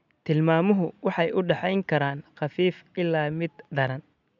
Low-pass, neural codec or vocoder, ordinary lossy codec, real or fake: 7.2 kHz; none; none; real